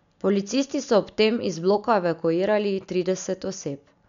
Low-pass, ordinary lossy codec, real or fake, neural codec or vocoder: 7.2 kHz; none; real; none